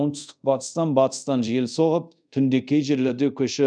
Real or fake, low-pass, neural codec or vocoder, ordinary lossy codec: fake; 9.9 kHz; codec, 24 kHz, 0.5 kbps, DualCodec; none